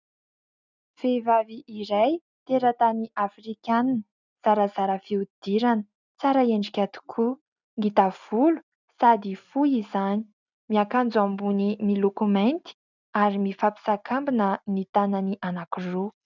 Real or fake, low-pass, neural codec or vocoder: real; 7.2 kHz; none